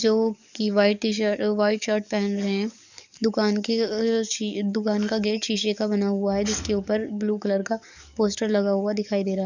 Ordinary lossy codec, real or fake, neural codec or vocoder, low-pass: none; fake; codec, 44.1 kHz, 7.8 kbps, DAC; 7.2 kHz